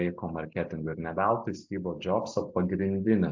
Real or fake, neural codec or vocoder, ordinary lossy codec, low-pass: real; none; MP3, 64 kbps; 7.2 kHz